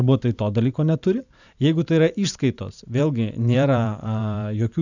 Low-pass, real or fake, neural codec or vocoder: 7.2 kHz; fake; vocoder, 44.1 kHz, 128 mel bands every 512 samples, BigVGAN v2